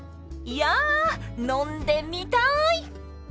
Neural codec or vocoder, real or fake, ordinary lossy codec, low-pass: none; real; none; none